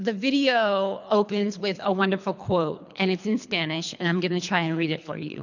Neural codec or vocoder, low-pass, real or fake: codec, 24 kHz, 3 kbps, HILCodec; 7.2 kHz; fake